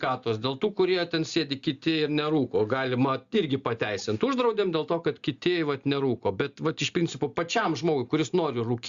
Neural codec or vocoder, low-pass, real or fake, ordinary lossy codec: none; 7.2 kHz; real; Opus, 64 kbps